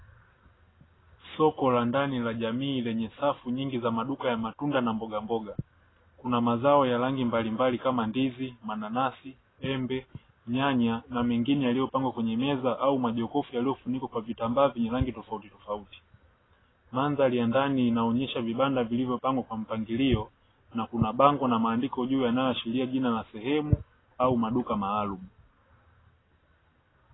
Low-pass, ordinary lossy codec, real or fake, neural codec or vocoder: 7.2 kHz; AAC, 16 kbps; real; none